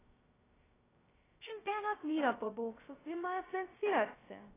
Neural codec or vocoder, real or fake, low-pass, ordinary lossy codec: codec, 16 kHz, 0.2 kbps, FocalCodec; fake; 3.6 kHz; AAC, 16 kbps